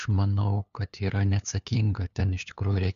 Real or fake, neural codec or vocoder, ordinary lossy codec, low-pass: fake; codec, 16 kHz, 2 kbps, FunCodec, trained on LibriTTS, 25 frames a second; MP3, 96 kbps; 7.2 kHz